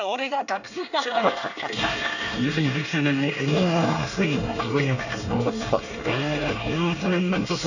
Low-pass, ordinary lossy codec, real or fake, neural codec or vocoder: 7.2 kHz; none; fake; codec, 24 kHz, 1 kbps, SNAC